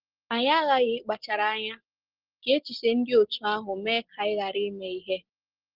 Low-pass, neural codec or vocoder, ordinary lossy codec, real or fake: 5.4 kHz; none; Opus, 16 kbps; real